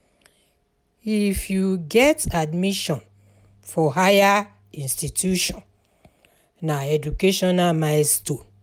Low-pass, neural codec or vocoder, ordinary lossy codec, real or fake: 19.8 kHz; none; none; real